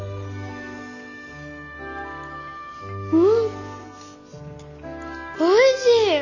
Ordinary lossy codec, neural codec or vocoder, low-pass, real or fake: none; none; 7.2 kHz; real